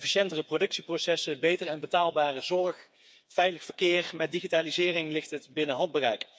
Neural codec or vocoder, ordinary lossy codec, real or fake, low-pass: codec, 16 kHz, 4 kbps, FreqCodec, smaller model; none; fake; none